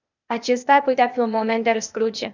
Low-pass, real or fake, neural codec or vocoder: 7.2 kHz; fake; codec, 16 kHz, 0.8 kbps, ZipCodec